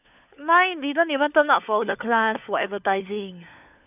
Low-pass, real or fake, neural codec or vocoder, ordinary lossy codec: 3.6 kHz; fake; codec, 16 kHz, 4 kbps, FunCodec, trained on LibriTTS, 50 frames a second; none